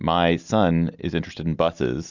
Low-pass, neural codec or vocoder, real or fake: 7.2 kHz; none; real